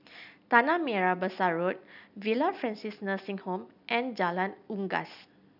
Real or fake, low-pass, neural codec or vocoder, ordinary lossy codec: real; 5.4 kHz; none; none